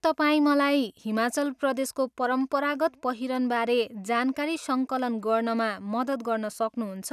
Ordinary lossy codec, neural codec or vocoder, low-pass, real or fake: none; none; 14.4 kHz; real